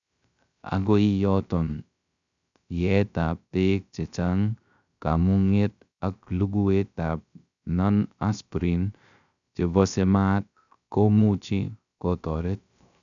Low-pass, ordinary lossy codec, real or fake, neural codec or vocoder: 7.2 kHz; none; fake; codec, 16 kHz, 0.3 kbps, FocalCodec